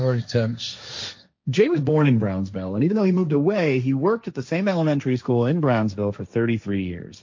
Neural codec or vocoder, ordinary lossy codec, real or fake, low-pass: codec, 16 kHz, 1.1 kbps, Voila-Tokenizer; MP3, 48 kbps; fake; 7.2 kHz